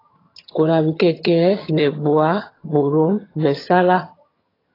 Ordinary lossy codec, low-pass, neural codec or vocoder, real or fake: AAC, 24 kbps; 5.4 kHz; vocoder, 22.05 kHz, 80 mel bands, HiFi-GAN; fake